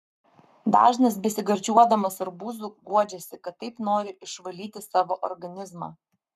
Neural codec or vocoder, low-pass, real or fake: codec, 44.1 kHz, 7.8 kbps, Pupu-Codec; 14.4 kHz; fake